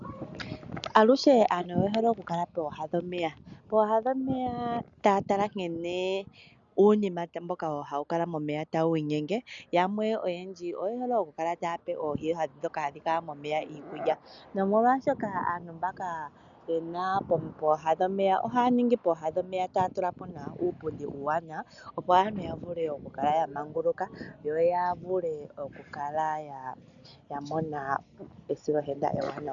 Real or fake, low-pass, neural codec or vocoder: real; 7.2 kHz; none